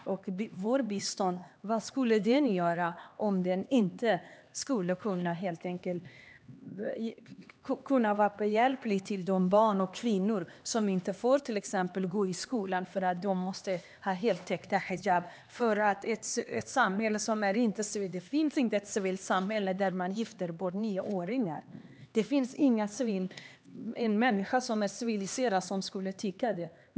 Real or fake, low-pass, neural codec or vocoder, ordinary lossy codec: fake; none; codec, 16 kHz, 2 kbps, X-Codec, HuBERT features, trained on LibriSpeech; none